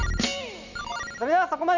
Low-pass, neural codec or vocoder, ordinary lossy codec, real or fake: 7.2 kHz; none; none; real